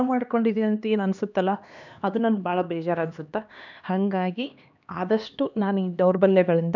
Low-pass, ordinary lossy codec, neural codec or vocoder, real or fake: 7.2 kHz; none; codec, 16 kHz, 2 kbps, X-Codec, HuBERT features, trained on LibriSpeech; fake